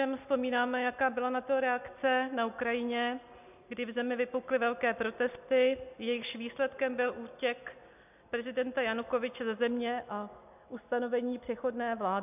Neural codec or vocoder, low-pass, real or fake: none; 3.6 kHz; real